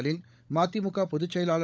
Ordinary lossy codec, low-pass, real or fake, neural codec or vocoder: none; none; fake; codec, 16 kHz, 16 kbps, FunCodec, trained on LibriTTS, 50 frames a second